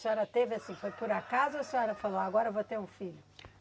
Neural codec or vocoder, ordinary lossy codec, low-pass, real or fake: none; none; none; real